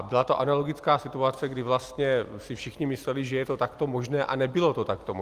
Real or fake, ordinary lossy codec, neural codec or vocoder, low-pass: real; Opus, 32 kbps; none; 14.4 kHz